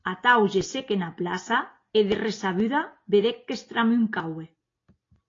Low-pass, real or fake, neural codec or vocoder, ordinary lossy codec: 7.2 kHz; real; none; AAC, 32 kbps